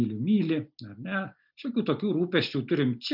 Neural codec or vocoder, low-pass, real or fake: none; 5.4 kHz; real